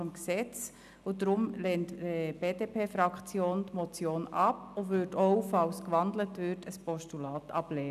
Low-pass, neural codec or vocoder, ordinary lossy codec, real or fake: 14.4 kHz; none; none; real